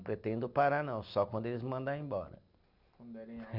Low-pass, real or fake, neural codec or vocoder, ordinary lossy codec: 5.4 kHz; real; none; none